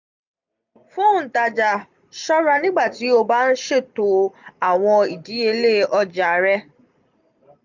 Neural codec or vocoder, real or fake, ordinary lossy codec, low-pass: none; real; none; 7.2 kHz